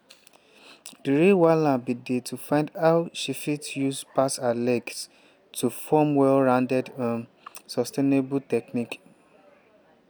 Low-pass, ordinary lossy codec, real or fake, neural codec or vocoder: none; none; real; none